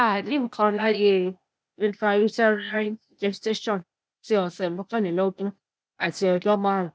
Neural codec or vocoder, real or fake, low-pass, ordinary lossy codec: codec, 16 kHz, 0.8 kbps, ZipCodec; fake; none; none